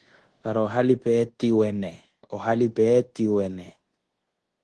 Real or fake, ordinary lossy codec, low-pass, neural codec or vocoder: fake; Opus, 16 kbps; 10.8 kHz; codec, 24 kHz, 1.2 kbps, DualCodec